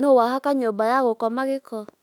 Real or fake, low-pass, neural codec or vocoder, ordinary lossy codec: fake; 19.8 kHz; autoencoder, 48 kHz, 32 numbers a frame, DAC-VAE, trained on Japanese speech; none